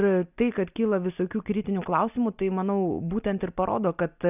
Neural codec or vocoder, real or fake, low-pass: none; real; 3.6 kHz